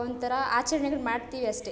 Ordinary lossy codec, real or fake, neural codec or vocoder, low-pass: none; real; none; none